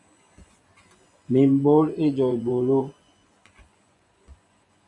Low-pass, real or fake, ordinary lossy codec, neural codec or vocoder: 10.8 kHz; fake; MP3, 96 kbps; vocoder, 24 kHz, 100 mel bands, Vocos